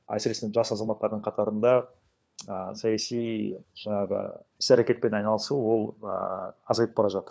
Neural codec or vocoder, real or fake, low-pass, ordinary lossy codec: codec, 16 kHz, 4 kbps, FunCodec, trained on LibriTTS, 50 frames a second; fake; none; none